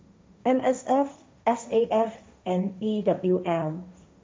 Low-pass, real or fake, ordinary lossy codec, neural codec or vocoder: none; fake; none; codec, 16 kHz, 1.1 kbps, Voila-Tokenizer